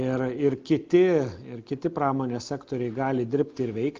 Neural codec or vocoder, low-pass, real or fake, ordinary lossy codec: none; 7.2 kHz; real; Opus, 24 kbps